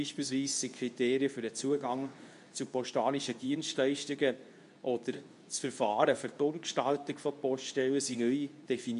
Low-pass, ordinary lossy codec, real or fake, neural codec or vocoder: 10.8 kHz; none; fake; codec, 24 kHz, 0.9 kbps, WavTokenizer, medium speech release version 2